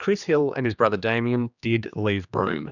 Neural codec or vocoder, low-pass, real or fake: codec, 16 kHz, 2 kbps, X-Codec, HuBERT features, trained on general audio; 7.2 kHz; fake